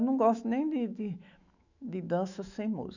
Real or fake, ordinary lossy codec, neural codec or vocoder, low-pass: fake; none; autoencoder, 48 kHz, 128 numbers a frame, DAC-VAE, trained on Japanese speech; 7.2 kHz